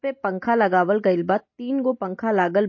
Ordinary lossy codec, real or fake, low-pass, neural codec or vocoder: MP3, 32 kbps; real; 7.2 kHz; none